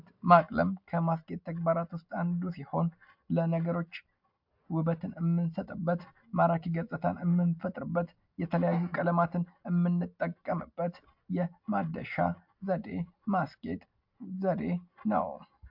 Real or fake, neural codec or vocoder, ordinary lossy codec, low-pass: real; none; MP3, 48 kbps; 5.4 kHz